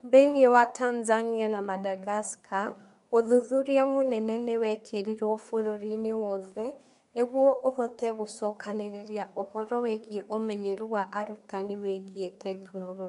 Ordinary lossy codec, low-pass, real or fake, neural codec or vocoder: none; 10.8 kHz; fake; codec, 24 kHz, 1 kbps, SNAC